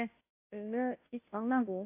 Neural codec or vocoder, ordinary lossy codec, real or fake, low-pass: codec, 16 kHz, 0.5 kbps, FunCodec, trained on Chinese and English, 25 frames a second; AAC, 32 kbps; fake; 3.6 kHz